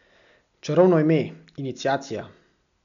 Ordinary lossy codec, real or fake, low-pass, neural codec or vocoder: none; real; 7.2 kHz; none